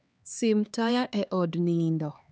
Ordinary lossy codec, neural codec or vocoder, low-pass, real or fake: none; codec, 16 kHz, 2 kbps, X-Codec, HuBERT features, trained on LibriSpeech; none; fake